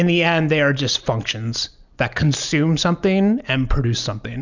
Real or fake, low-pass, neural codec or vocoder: real; 7.2 kHz; none